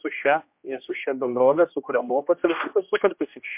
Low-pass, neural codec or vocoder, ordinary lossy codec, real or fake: 3.6 kHz; codec, 16 kHz, 1 kbps, X-Codec, HuBERT features, trained on general audio; MP3, 32 kbps; fake